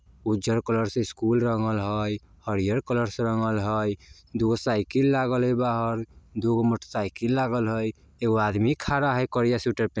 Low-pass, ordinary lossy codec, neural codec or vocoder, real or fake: none; none; none; real